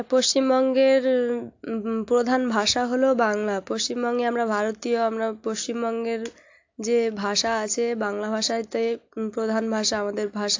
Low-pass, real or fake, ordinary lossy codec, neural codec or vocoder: 7.2 kHz; real; AAC, 48 kbps; none